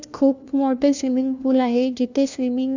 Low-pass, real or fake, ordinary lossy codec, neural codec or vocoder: 7.2 kHz; fake; none; codec, 16 kHz, 1 kbps, FunCodec, trained on LibriTTS, 50 frames a second